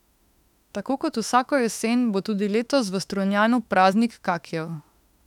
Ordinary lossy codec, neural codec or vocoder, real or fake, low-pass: none; autoencoder, 48 kHz, 32 numbers a frame, DAC-VAE, trained on Japanese speech; fake; 19.8 kHz